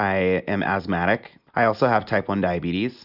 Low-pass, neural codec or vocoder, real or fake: 5.4 kHz; none; real